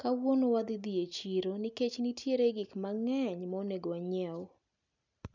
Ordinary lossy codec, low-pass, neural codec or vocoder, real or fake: none; 7.2 kHz; none; real